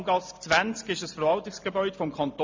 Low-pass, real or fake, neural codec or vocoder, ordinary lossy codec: 7.2 kHz; real; none; MP3, 48 kbps